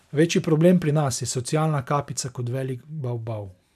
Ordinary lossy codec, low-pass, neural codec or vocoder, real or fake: none; 14.4 kHz; none; real